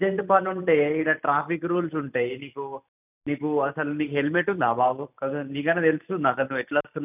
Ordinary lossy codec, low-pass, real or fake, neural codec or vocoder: none; 3.6 kHz; real; none